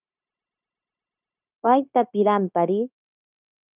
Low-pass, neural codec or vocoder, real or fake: 3.6 kHz; codec, 16 kHz, 0.9 kbps, LongCat-Audio-Codec; fake